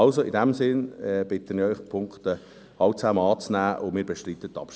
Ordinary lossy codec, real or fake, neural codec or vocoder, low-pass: none; real; none; none